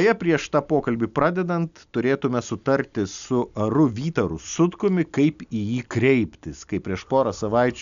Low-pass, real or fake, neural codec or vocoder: 7.2 kHz; real; none